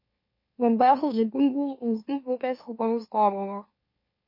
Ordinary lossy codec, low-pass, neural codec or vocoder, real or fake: MP3, 32 kbps; 5.4 kHz; autoencoder, 44.1 kHz, a latent of 192 numbers a frame, MeloTTS; fake